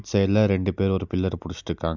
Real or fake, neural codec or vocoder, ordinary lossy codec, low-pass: real; none; none; 7.2 kHz